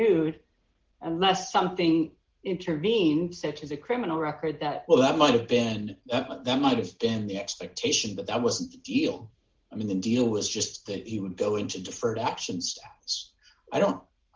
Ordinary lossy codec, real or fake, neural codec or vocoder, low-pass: Opus, 16 kbps; real; none; 7.2 kHz